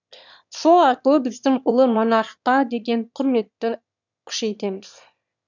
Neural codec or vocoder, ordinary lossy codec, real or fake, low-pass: autoencoder, 22.05 kHz, a latent of 192 numbers a frame, VITS, trained on one speaker; none; fake; 7.2 kHz